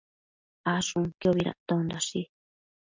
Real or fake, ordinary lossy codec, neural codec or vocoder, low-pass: real; MP3, 64 kbps; none; 7.2 kHz